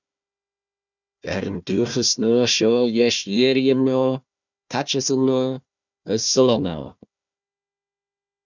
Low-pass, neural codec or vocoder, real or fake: 7.2 kHz; codec, 16 kHz, 1 kbps, FunCodec, trained on Chinese and English, 50 frames a second; fake